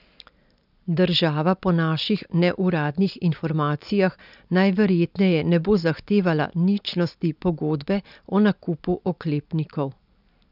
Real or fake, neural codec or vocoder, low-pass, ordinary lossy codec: real; none; 5.4 kHz; none